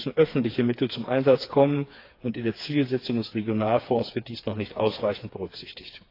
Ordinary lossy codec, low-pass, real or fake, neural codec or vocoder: AAC, 32 kbps; 5.4 kHz; fake; codec, 16 kHz, 4 kbps, FreqCodec, smaller model